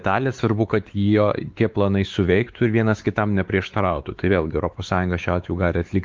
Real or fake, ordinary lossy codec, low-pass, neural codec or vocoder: fake; Opus, 32 kbps; 7.2 kHz; codec, 16 kHz, 4 kbps, X-Codec, WavLM features, trained on Multilingual LibriSpeech